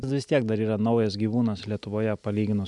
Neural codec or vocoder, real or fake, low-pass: none; real; 10.8 kHz